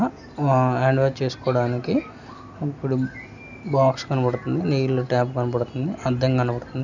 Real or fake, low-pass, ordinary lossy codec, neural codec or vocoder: real; 7.2 kHz; none; none